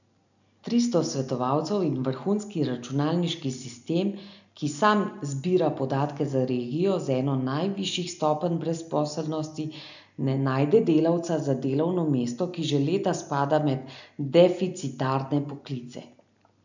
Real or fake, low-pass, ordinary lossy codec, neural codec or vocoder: real; 7.2 kHz; none; none